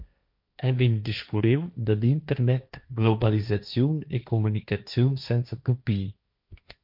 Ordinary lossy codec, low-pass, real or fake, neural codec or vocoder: MP3, 48 kbps; 5.4 kHz; fake; codec, 16 kHz, 1.1 kbps, Voila-Tokenizer